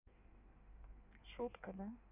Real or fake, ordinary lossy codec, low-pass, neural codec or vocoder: fake; none; 3.6 kHz; codec, 16 kHz in and 24 kHz out, 1.1 kbps, FireRedTTS-2 codec